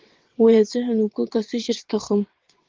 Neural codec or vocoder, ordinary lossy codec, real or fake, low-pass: vocoder, 22.05 kHz, 80 mel bands, WaveNeXt; Opus, 16 kbps; fake; 7.2 kHz